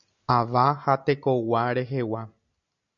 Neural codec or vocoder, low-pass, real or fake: none; 7.2 kHz; real